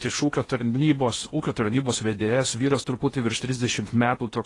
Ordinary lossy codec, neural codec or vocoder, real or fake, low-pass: AAC, 32 kbps; codec, 16 kHz in and 24 kHz out, 0.8 kbps, FocalCodec, streaming, 65536 codes; fake; 10.8 kHz